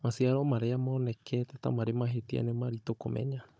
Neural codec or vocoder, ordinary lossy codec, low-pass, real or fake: codec, 16 kHz, 16 kbps, FunCodec, trained on Chinese and English, 50 frames a second; none; none; fake